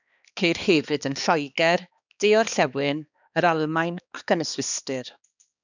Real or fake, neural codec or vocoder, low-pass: fake; codec, 16 kHz, 2 kbps, X-Codec, HuBERT features, trained on balanced general audio; 7.2 kHz